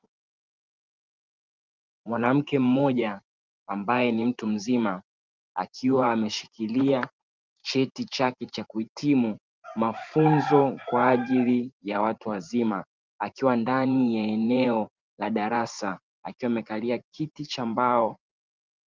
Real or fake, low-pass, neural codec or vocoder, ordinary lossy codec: fake; 7.2 kHz; vocoder, 44.1 kHz, 128 mel bands every 512 samples, BigVGAN v2; Opus, 24 kbps